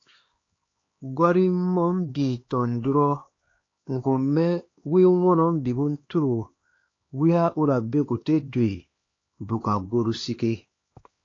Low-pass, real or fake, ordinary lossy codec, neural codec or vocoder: 7.2 kHz; fake; AAC, 32 kbps; codec, 16 kHz, 2 kbps, X-Codec, HuBERT features, trained on LibriSpeech